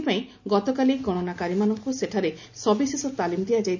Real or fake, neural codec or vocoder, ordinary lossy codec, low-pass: real; none; none; 7.2 kHz